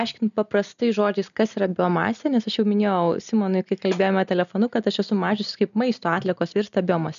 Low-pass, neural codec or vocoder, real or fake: 7.2 kHz; none; real